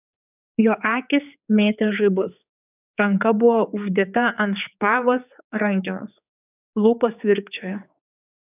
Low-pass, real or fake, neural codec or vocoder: 3.6 kHz; fake; codec, 16 kHz, 4 kbps, X-Codec, HuBERT features, trained on general audio